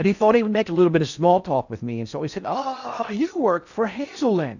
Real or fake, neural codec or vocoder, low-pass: fake; codec, 16 kHz in and 24 kHz out, 0.6 kbps, FocalCodec, streaming, 4096 codes; 7.2 kHz